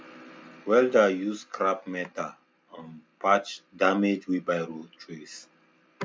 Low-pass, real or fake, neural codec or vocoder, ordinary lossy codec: none; real; none; none